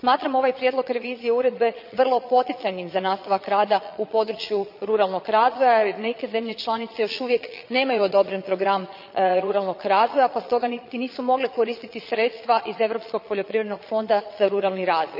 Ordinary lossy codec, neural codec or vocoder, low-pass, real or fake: none; vocoder, 22.05 kHz, 80 mel bands, Vocos; 5.4 kHz; fake